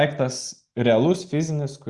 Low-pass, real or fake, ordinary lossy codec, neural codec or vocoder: 10.8 kHz; real; Opus, 64 kbps; none